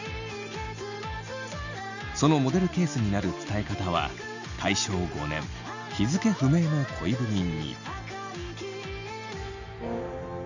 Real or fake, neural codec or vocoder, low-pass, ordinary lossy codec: real; none; 7.2 kHz; none